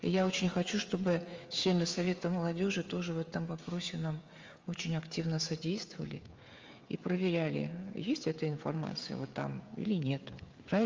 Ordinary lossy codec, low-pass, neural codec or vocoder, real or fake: Opus, 32 kbps; 7.2 kHz; codec, 16 kHz, 16 kbps, FreqCodec, smaller model; fake